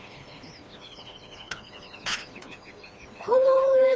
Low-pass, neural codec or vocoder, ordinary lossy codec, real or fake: none; codec, 16 kHz, 2 kbps, FreqCodec, smaller model; none; fake